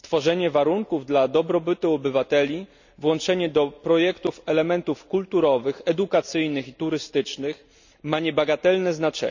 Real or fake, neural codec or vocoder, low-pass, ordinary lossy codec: real; none; 7.2 kHz; none